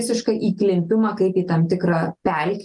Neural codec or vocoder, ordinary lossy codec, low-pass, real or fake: none; Opus, 32 kbps; 10.8 kHz; real